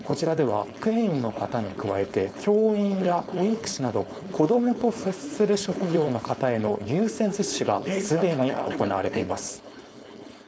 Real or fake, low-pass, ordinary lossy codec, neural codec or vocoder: fake; none; none; codec, 16 kHz, 4.8 kbps, FACodec